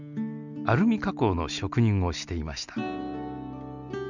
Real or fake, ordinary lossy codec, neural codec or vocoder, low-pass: real; none; none; 7.2 kHz